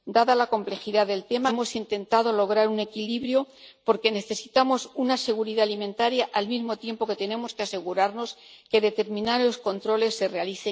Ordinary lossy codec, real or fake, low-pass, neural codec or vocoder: none; real; none; none